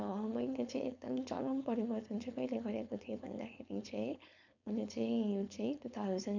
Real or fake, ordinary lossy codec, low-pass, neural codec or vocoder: fake; none; 7.2 kHz; codec, 16 kHz, 4.8 kbps, FACodec